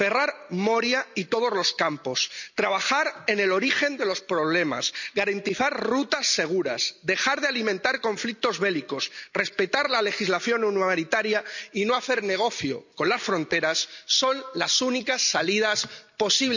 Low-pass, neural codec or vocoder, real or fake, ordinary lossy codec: 7.2 kHz; none; real; none